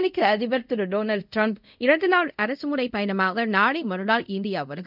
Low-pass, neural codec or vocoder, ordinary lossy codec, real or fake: 5.4 kHz; codec, 24 kHz, 0.5 kbps, DualCodec; none; fake